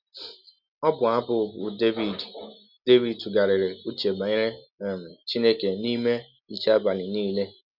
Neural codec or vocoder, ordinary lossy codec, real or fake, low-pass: none; none; real; 5.4 kHz